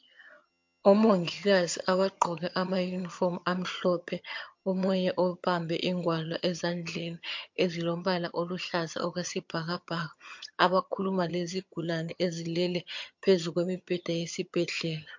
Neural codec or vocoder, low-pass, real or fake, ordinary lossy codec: vocoder, 22.05 kHz, 80 mel bands, HiFi-GAN; 7.2 kHz; fake; MP3, 48 kbps